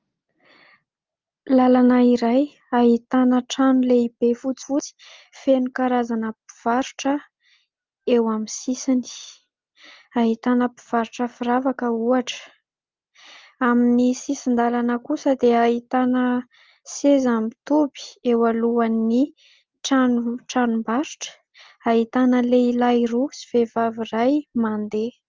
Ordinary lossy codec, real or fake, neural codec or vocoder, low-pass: Opus, 32 kbps; real; none; 7.2 kHz